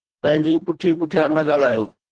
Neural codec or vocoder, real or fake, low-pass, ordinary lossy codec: codec, 24 kHz, 1.5 kbps, HILCodec; fake; 9.9 kHz; Opus, 16 kbps